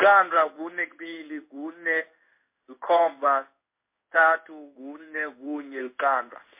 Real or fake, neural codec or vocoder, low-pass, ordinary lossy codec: fake; codec, 16 kHz in and 24 kHz out, 1 kbps, XY-Tokenizer; 3.6 kHz; MP3, 32 kbps